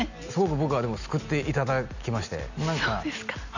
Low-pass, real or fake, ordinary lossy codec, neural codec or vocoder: 7.2 kHz; real; none; none